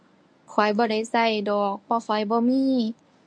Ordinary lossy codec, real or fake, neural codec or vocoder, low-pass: MP3, 48 kbps; fake; codec, 24 kHz, 0.9 kbps, WavTokenizer, medium speech release version 1; 9.9 kHz